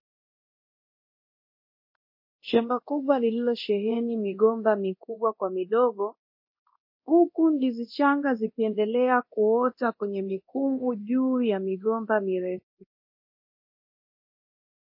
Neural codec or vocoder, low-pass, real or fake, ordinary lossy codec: codec, 24 kHz, 0.9 kbps, DualCodec; 5.4 kHz; fake; MP3, 32 kbps